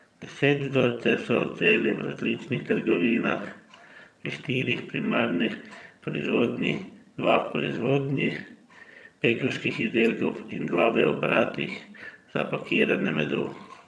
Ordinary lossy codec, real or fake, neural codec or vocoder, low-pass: none; fake; vocoder, 22.05 kHz, 80 mel bands, HiFi-GAN; none